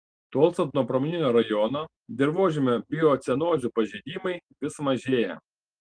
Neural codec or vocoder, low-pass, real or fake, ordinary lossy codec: none; 9.9 kHz; real; Opus, 24 kbps